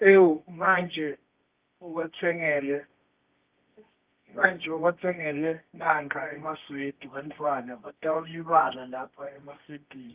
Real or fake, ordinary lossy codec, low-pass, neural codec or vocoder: fake; Opus, 32 kbps; 3.6 kHz; codec, 24 kHz, 0.9 kbps, WavTokenizer, medium music audio release